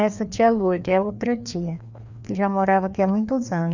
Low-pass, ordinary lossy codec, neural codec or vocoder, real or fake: 7.2 kHz; none; codec, 16 kHz, 2 kbps, FreqCodec, larger model; fake